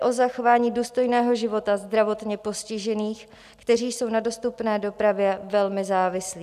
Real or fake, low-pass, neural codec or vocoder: real; 14.4 kHz; none